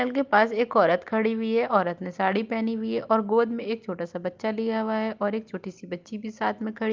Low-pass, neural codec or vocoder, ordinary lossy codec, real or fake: 7.2 kHz; none; Opus, 24 kbps; real